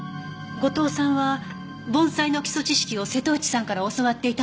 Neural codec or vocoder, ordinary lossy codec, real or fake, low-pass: none; none; real; none